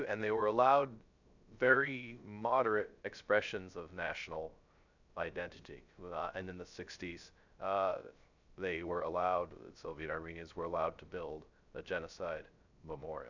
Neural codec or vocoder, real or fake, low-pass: codec, 16 kHz, 0.3 kbps, FocalCodec; fake; 7.2 kHz